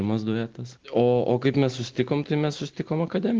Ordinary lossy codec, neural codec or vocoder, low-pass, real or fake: Opus, 16 kbps; none; 7.2 kHz; real